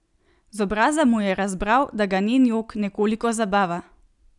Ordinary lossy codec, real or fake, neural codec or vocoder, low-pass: none; real; none; 10.8 kHz